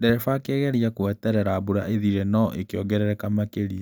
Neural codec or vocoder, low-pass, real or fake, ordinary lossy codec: none; none; real; none